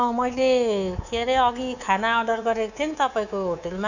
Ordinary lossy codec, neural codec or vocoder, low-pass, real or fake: none; codec, 24 kHz, 3.1 kbps, DualCodec; 7.2 kHz; fake